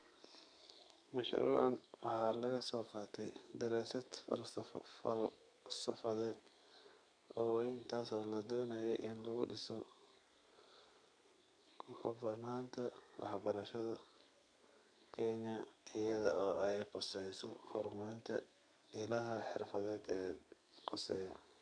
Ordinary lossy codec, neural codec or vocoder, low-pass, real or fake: none; codec, 32 kHz, 1.9 kbps, SNAC; 9.9 kHz; fake